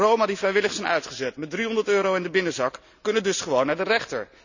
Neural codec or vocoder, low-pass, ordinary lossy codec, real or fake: none; 7.2 kHz; none; real